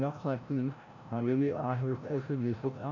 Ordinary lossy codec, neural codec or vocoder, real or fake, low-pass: none; codec, 16 kHz, 0.5 kbps, FreqCodec, larger model; fake; 7.2 kHz